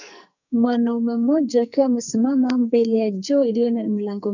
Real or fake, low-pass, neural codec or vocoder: fake; 7.2 kHz; codec, 44.1 kHz, 2.6 kbps, SNAC